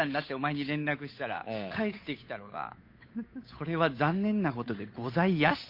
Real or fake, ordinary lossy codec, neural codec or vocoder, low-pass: fake; MP3, 32 kbps; codec, 16 kHz, 8 kbps, FunCodec, trained on Chinese and English, 25 frames a second; 5.4 kHz